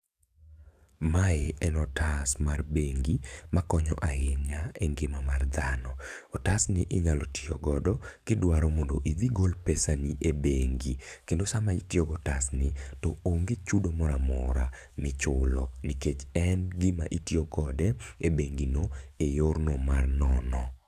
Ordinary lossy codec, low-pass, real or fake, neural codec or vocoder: none; 14.4 kHz; fake; codec, 44.1 kHz, 7.8 kbps, DAC